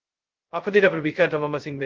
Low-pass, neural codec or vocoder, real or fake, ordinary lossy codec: 7.2 kHz; codec, 16 kHz, 0.2 kbps, FocalCodec; fake; Opus, 16 kbps